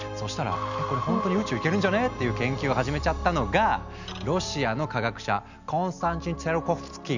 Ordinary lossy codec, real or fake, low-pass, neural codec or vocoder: none; real; 7.2 kHz; none